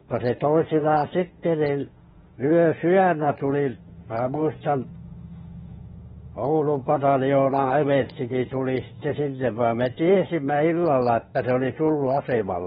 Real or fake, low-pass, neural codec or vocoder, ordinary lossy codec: fake; 19.8 kHz; autoencoder, 48 kHz, 32 numbers a frame, DAC-VAE, trained on Japanese speech; AAC, 16 kbps